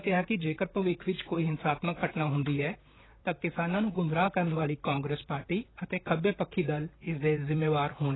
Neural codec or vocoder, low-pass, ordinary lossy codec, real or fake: vocoder, 44.1 kHz, 128 mel bands, Pupu-Vocoder; 7.2 kHz; AAC, 16 kbps; fake